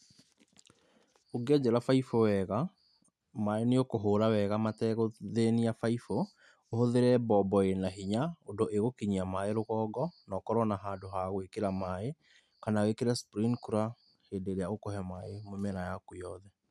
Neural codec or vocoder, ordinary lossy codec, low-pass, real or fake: none; none; none; real